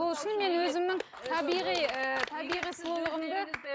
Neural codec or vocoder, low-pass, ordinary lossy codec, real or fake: none; none; none; real